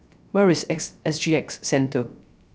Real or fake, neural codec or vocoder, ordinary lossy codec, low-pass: fake; codec, 16 kHz, 0.3 kbps, FocalCodec; none; none